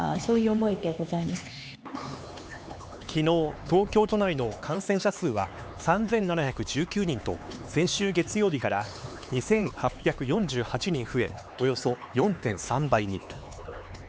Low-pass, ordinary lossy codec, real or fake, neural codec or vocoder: none; none; fake; codec, 16 kHz, 4 kbps, X-Codec, HuBERT features, trained on LibriSpeech